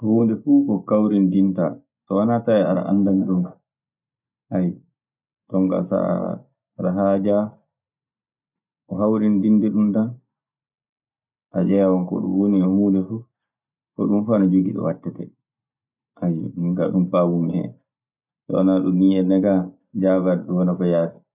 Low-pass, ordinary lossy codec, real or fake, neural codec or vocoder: 3.6 kHz; none; real; none